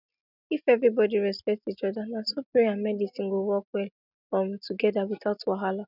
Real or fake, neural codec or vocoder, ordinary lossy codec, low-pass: real; none; none; 5.4 kHz